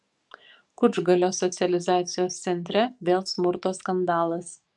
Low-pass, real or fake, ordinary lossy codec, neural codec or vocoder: 10.8 kHz; fake; MP3, 96 kbps; codec, 44.1 kHz, 7.8 kbps, DAC